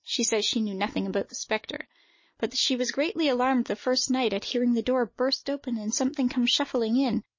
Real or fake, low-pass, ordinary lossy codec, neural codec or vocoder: real; 7.2 kHz; MP3, 32 kbps; none